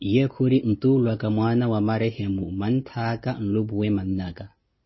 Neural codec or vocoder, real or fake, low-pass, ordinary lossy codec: none; real; 7.2 kHz; MP3, 24 kbps